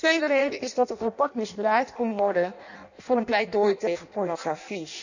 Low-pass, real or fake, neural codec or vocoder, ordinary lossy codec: 7.2 kHz; fake; codec, 16 kHz in and 24 kHz out, 0.6 kbps, FireRedTTS-2 codec; none